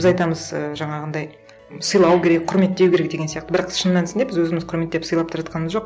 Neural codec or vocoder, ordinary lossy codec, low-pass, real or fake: none; none; none; real